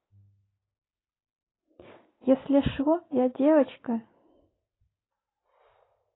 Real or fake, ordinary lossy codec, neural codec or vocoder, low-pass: real; AAC, 16 kbps; none; 7.2 kHz